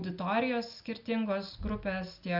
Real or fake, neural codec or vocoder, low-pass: real; none; 5.4 kHz